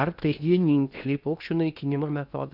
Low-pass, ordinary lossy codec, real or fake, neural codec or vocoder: 5.4 kHz; Opus, 64 kbps; fake; codec, 16 kHz in and 24 kHz out, 0.8 kbps, FocalCodec, streaming, 65536 codes